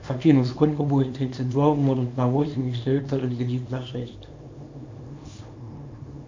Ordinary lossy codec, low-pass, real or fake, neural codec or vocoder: MP3, 64 kbps; 7.2 kHz; fake; codec, 24 kHz, 0.9 kbps, WavTokenizer, small release